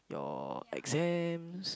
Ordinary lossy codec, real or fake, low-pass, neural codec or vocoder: none; real; none; none